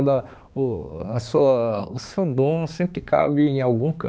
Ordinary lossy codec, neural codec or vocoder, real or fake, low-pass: none; codec, 16 kHz, 2 kbps, X-Codec, HuBERT features, trained on balanced general audio; fake; none